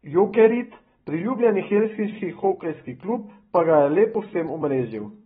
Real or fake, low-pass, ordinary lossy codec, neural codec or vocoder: fake; 19.8 kHz; AAC, 16 kbps; vocoder, 44.1 kHz, 128 mel bands every 256 samples, BigVGAN v2